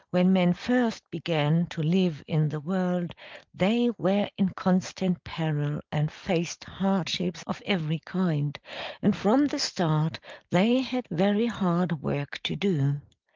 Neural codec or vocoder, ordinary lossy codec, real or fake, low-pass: none; Opus, 24 kbps; real; 7.2 kHz